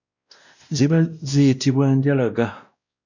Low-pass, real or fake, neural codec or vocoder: 7.2 kHz; fake; codec, 16 kHz, 1 kbps, X-Codec, WavLM features, trained on Multilingual LibriSpeech